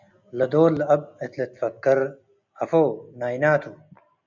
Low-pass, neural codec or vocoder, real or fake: 7.2 kHz; none; real